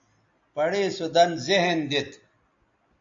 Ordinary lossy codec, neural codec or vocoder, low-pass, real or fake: MP3, 48 kbps; none; 7.2 kHz; real